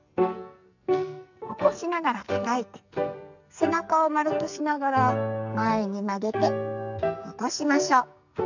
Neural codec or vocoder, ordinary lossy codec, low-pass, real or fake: codec, 44.1 kHz, 2.6 kbps, SNAC; none; 7.2 kHz; fake